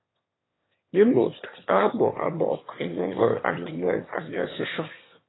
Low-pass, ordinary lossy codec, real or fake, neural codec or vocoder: 7.2 kHz; AAC, 16 kbps; fake; autoencoder, 22.05 kHz, a latent of 192 numbers a frame, VITS, trained on one speaker